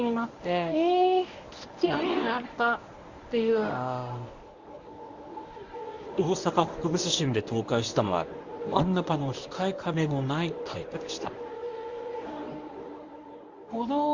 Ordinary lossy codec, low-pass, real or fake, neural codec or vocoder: Opus, 64 kbps; 7.2 kHz; fake; codec, 24 kHz, 0.9 kbps, WavTokenizer, medium speech release version 1